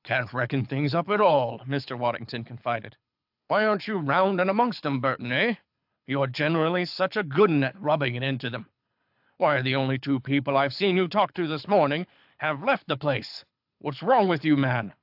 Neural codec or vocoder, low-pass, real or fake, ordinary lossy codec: codec, 24 kHz, 6 kbps, HILCodec; 5.4 kHz; fake; AAC, 48 kbps